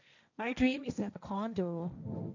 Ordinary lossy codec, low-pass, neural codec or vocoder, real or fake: none; 7.2 kHz; codec, 16 kHz, 1.1 kbps, Voila-Tokenizer; fake